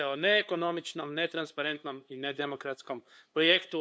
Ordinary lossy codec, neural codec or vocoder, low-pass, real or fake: none; codec, 16 kHz, 2 kbps, FunCodec, trained on LibriTTS, 25 frames a second; none; fake